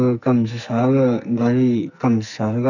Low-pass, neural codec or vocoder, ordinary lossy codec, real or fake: 7.2 kHz; codec, 44.1 kHz, 2.6 kbps, SNAC; none; fake